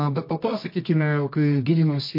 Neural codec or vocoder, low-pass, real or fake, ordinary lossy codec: codec, 24 kHz, 0.9 kbps, WavTokenizer, medium music audio release; 5.4 kHz; fake; MP3, 32 kbps